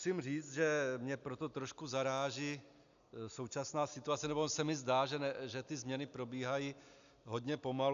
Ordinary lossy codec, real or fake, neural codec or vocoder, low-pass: AAC, 96 kbps; real; none; 7.2 kHz